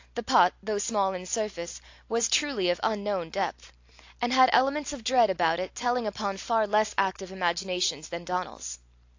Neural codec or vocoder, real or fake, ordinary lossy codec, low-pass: none; real; AAC, 48 kbps; 7.2 kHz